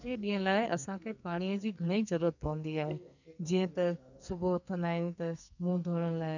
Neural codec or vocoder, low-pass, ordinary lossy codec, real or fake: codec, 44.1 kHz, 2.6 kbps, SNAC; 7.2 kHz; none; fake